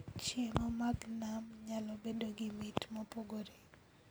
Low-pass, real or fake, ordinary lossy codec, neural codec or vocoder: none; real; none; none